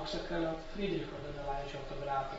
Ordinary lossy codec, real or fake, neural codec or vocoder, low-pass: AAC, 24 kbps; real; none; 19.8 kHz